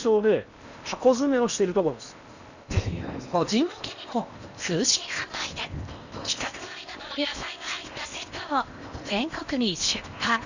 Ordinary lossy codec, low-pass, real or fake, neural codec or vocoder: none; 7.2 kHz; fake; codec, 16 kHz in and 24 kHz out, 0.8 kbps, FocalCodec, streaming, 65536 codes